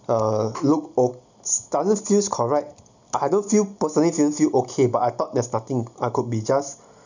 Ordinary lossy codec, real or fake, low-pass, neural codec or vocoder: none; real; 7.2 kHz; none